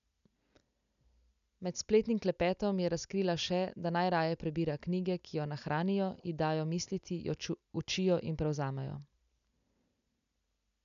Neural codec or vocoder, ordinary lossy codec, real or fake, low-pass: none; none; real; 7.2 kHz